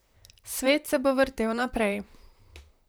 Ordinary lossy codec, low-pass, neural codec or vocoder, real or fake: none; none; vocoder, 44.1 kHz, 128 mel bands, Pupu-Vocoder; fake